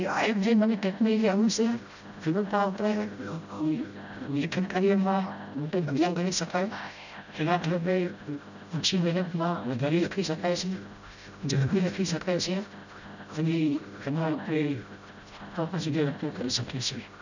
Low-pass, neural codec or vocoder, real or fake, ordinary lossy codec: 7.2 kHz; codec, 16 kHz, 0.5 kbps, FreqCodec, smaller model; fake; none